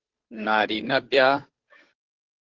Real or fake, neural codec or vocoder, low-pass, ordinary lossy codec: fake; codec, 16 kHz, 2 kbps, FunCodec, trained on Chinese and English, 25 frames a second; 7.2 kHz; Opus, 16 kbps